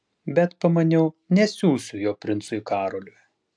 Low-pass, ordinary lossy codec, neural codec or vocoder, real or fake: 9.9 kHz; AAC, 64 kbps; none; real